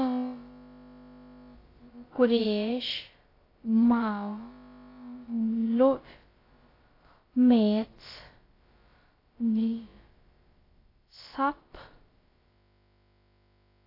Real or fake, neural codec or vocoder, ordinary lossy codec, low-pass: fake; codec, 16 kHz, about 1 kbps, DyCAST, with the encoder's durations; AAC, 24 kbps; 5.4 kHz